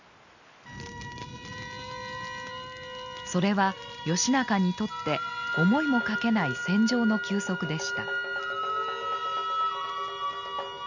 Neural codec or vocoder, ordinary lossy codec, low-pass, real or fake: none; none; 7.2 kHz; real